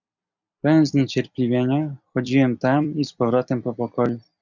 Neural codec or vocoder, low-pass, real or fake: vocoder, 44.1 kHz, 128 mel bands every 256 samples, BigVGAN v2; 7.2 kHz; fake